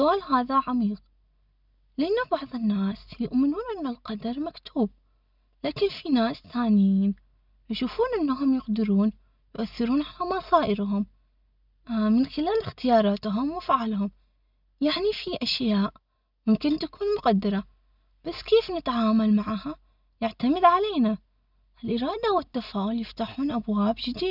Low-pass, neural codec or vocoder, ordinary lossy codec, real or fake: 5.4 kHz; none; none; real